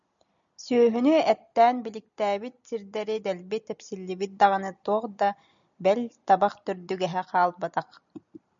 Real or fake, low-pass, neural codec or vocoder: real; 7.2 kHz; none